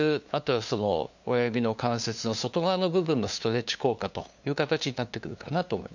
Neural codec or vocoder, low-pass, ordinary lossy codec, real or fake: codec, 16 kHz, 2 kbps, FunCodec, trained on LibriTTS, 25 frames a second; 7.2 kHz; none; fake